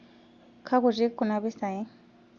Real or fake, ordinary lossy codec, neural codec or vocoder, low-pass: real; Opus, 64 kbps; none; 7.2 kHz